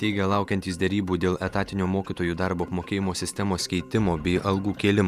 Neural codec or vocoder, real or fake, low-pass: vocoder, 48 kHz, 128 mel bands, Vocos; fake; 14.4 kHz